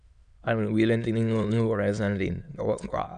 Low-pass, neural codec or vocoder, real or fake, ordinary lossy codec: 9.9 kHz; autoencoder, 22.05 kHz, a latent of 192 numbers a frame, VITS, trained on many speakers; fake; none